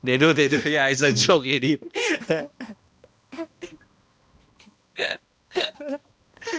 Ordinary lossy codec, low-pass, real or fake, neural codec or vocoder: none; none; fake; codec, 16 kHz, 1 kbps, X-Codec, HuBERT features, trained on balanced general audio